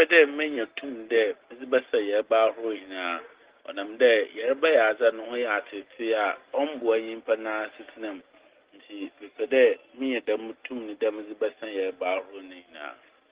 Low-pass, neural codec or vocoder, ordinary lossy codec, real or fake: 3.6 kHz; none; Opus, 16 kbps; real